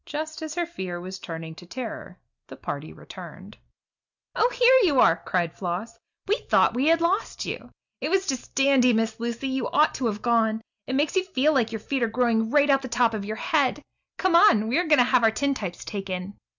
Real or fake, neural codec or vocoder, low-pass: real; none; 7.2 kHz